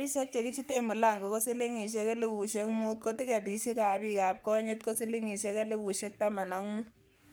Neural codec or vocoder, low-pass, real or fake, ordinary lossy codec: codec, 44.1 kHz, 3.4 kbps, Pupu-Codec; none; fake; none